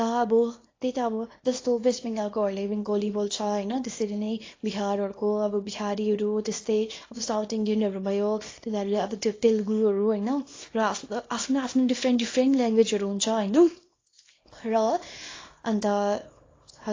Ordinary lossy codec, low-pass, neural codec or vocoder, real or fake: AAC, 32 kbps; 7.2 kHz; codec, 24 kHz, 0.9 kbps, WavTokenizer, small release; fake